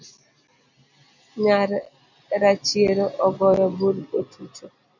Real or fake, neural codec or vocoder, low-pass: real; none; 7.2 kHz